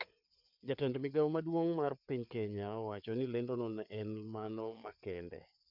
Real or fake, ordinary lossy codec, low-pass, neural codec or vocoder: fake; MP3, 48 kbps; 5.4 kHz; codec, 16 kHz, 4 kbps, FreqCodec, larger model